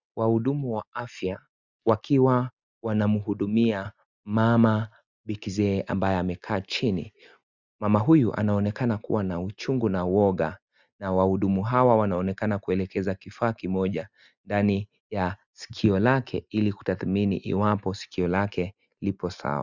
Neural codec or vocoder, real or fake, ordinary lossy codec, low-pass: none; real; Opus, 64 kbps; 7.2 kHz